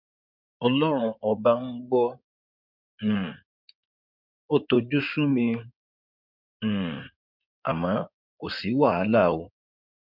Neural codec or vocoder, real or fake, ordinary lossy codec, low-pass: codec, 16 kHz in and 24 kHz out, 2.2 kbps, FireRedTTS-2 codec; fake; MP3, 48 kbps; 5.4 kHz